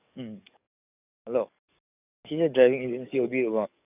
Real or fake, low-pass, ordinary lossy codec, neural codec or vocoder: fake; 3.6 kHz; none; codec, 44.1 kHz, 7.8 kbps, DAC